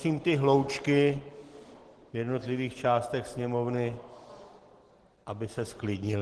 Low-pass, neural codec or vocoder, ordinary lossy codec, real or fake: 10.8 kHz; none; Opus, 16 kbps; real